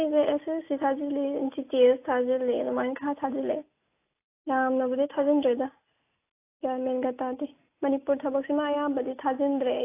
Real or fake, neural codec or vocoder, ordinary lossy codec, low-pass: real; none; AAC, 24 kbps; 3.6 kHz